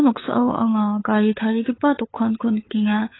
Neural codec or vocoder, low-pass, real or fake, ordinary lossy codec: codec, 16 kHz, 8 kbps, FunCodec, trained on LibriTTS, 25 frames a second; 7.2 kHz; fake; AAC, 16 kbps